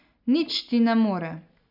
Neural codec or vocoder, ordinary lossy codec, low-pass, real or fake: none; none; 5.4 kHz; real